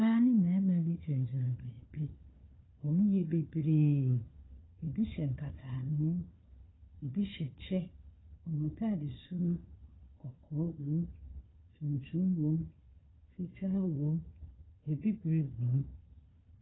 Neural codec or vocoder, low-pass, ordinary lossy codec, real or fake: codec, 16 kHz, 4 kbps, FunCodec, trained on Chinese and English, 50 frames a second; 7.2 kHz; AAC, 16 kbps; fake